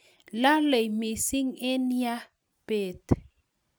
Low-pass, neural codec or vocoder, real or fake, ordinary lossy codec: none; none; real; none